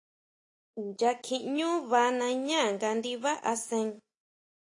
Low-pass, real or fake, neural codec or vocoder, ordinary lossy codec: 10.8 kHz; real; none; AAC, 48 kbps